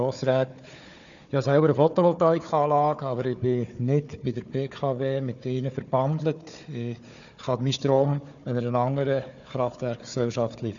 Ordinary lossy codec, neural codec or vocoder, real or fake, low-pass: none; codec, 16 kHz, 4 kbps, FunCodec, trained on Chinese and English, 50 frames a second; fake; 7.2 kHz